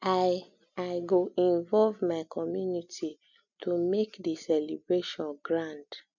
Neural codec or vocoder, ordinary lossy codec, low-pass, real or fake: none; none; 7.2 kHz; real